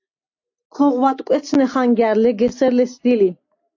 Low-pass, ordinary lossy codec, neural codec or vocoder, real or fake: 7.2 kHz; AAC, 48 kbps; none; real